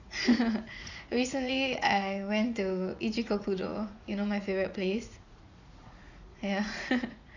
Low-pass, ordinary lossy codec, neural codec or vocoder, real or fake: 7.2 kHz; none; vocoder, 44.1 kHz, 80 mel bands, Vocos; fake